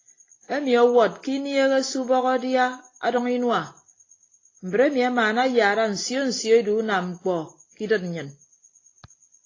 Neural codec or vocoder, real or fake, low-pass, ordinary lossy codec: none; real; 7.2 kHz; AAC, 32 kbps